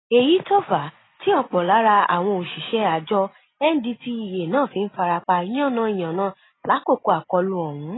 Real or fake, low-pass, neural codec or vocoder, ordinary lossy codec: real; 7.2 kHz; none; AAC, 16 kbps